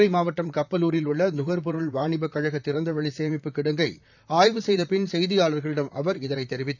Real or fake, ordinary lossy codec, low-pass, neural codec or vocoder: fake; none; 7.2 kHz; vocoder, 44.1 kHz, 128 mel bands, Pupu-Vocoder